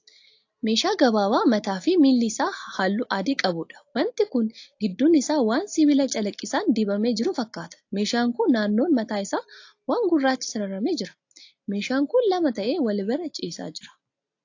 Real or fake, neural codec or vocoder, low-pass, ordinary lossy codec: real; none; 7.2 kHz; AAC, 48 kbps